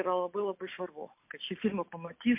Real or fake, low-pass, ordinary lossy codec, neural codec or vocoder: real; 3.6 kHz; MP3, 32 kbps; none